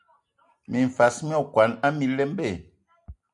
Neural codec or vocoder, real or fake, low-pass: none; real; 10.8 kHz